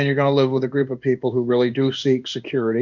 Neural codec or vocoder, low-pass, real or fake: codec, 16 kHz in and 24 kHz out, 1 kbps, XY-Tokenizer; 7.2 kHz; fake